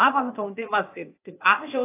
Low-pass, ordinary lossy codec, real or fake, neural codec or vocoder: 3.6 kHz; AAC, 24 kbps; fake; codec, 16 kHz, 0.8 kbps, ZipCodec